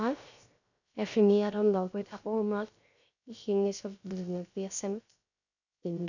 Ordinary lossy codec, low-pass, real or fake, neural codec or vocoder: none; 7.2 kHz; fake; codec, 16 kHz, 0.3 kbps, FocalCodec